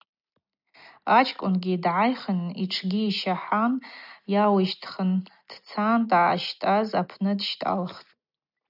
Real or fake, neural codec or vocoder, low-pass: real; none; 5.4 kHz